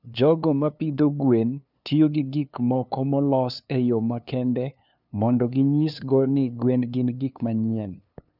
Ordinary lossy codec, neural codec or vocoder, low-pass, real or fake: none; codec, 16 kHz, 2 kbps, FunCodec, trained on LibriTTS, 25 frames a second; 5.4 kHz; fake